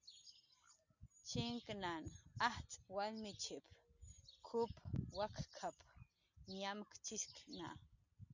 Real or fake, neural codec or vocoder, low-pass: real; none; 7.2 kHz